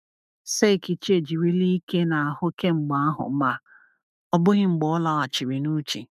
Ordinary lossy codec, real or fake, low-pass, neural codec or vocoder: none; fake; 14.4 kHz; autoencoder, 48 kHz, 128 numbers a frame, DAC-VAE, trained on Japanese speech